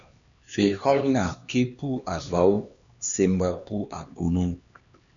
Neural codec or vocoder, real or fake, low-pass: codec, 16 kHz, 2 kbps, X-Codec, HuBERT features, trained on LibriSpeech; fake; 7.2 kHz